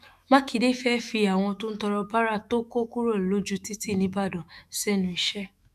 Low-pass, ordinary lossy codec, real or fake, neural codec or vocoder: 14.4 kHz; none; fake; autoencoder, 48 kHz, 128 numbers a frame, DAC-VAE, trained on Japanese speech